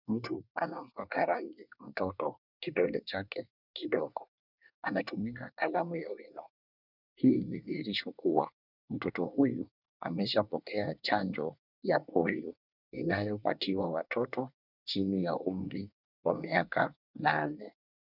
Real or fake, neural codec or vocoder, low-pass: fake; codec, 24 kHz, 1 kbps, SNAC; 5.4 kHz